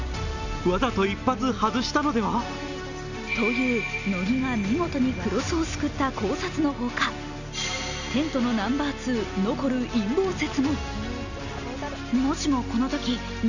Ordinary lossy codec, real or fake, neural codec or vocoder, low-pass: none; real; none; 7.2 kHz